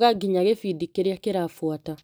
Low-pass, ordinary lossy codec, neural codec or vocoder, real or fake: none; none; none; real